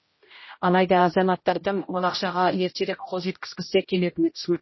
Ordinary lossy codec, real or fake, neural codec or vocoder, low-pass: MP3, 24 kbps; fake; codec, 16 kHz, 0.5 kbps, X-Codec, HuBERT features, trained on general audio; 7.2 kHz